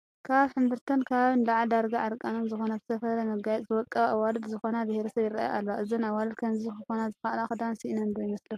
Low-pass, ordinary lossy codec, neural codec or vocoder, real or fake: 14.4 kHz; AAC, 64 kbps; none; real